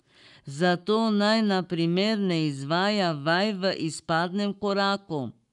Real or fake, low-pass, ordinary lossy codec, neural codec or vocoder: fake; 10.8 kHz; none; codec, 44.1 kHz, 7.8 kbps, Pupu-Codec